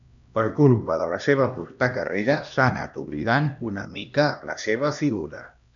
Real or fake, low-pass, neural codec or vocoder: fake; 7.2 kHz; codec, 16 kHz, 1 kbps, X-Codec, HuBERT features, trained on LibriSpeech